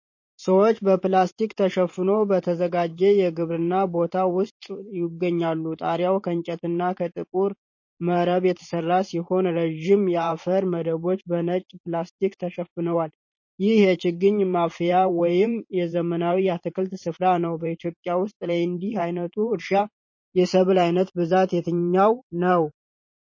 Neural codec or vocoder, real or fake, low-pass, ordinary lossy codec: vocoder, 44.1 kHz, 128 mel bands every 512 samples, BigVGAN v2; fake; 7.2 kHz; MP3, 32 kbps